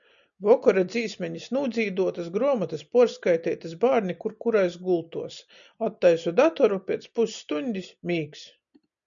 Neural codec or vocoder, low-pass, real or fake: none; 7.2 kHz; real